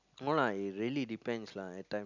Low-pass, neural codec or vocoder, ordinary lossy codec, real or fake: 7.2 kHz; none; none; real